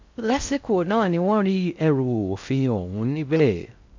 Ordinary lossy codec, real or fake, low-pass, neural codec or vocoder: MP3, 64 kbps; fake; 7.2 kHz; codec, 16 kHz in and 24 kHz out, 0.6 kbps, FocalCodec, streaming, 4096 codes